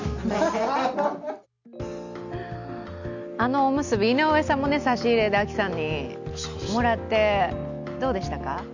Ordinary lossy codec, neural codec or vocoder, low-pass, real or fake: none; none; 7.2 kHz; real